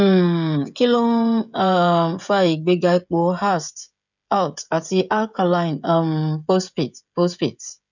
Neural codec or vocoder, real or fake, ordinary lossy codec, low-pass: codec, 16 kHz, 16 kbps, FreqCodec, smaller model; fake; none; 7.2 kHz